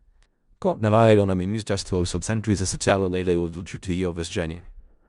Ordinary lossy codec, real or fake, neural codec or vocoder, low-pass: Opus, 64 kbps; fake; codec, 16 kHz in and 24 kHz out, 0.4 kbps, LongCat-Audio-Codec, four codebook decoder; 10.8 kHz